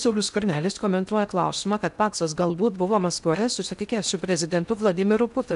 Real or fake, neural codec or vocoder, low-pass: fake; codec, 16 kHz in and 24 kHz out, 0.6 kbps, FocalCodec, streaming, 2048 codes; 10.8 kHz